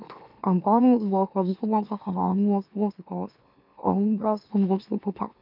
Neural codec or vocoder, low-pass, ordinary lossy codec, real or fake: autoencoder, 44.1 kHz, a latent of 192 numbers a frame, MeloTTS; 5.4 kHz; MP3, 48 kbps; fake